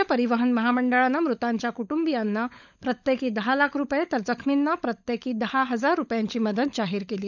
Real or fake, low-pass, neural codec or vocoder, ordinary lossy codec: fake; 7.2 kHz; codec, 16 kHz, 16 kbps, FunCodec, trained on LibriTTS, 50 frames a second; none